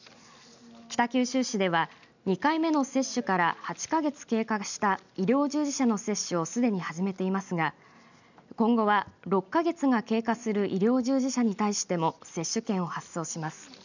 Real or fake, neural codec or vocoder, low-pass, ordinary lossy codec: real; none; 7.2 kHz; none